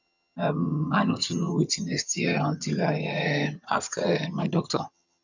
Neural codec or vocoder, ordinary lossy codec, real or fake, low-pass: vocoder, 22.05 kHz, 80 mel bands, HiFi-GAN; none; fake; 7.2 kHz